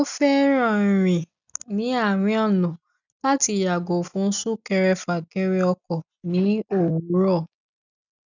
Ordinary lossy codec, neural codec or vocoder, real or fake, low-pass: none; none; real; 7.2 kHz